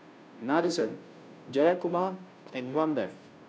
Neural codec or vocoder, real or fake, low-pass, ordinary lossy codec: codec, 16 kHz, 0.5 kbps, FunCodec, trained on Chinese and English, 25 frames a second; fake; none; none